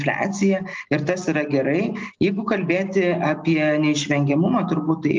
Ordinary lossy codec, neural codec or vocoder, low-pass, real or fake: Opus, 24 kbps; none; 7.2 kHz; real